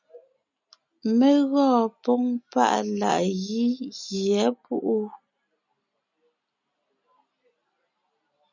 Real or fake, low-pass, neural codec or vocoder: real; 7.2 kHz; none